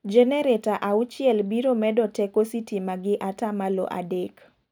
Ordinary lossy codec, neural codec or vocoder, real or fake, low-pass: none; none; real; 19.8 kHz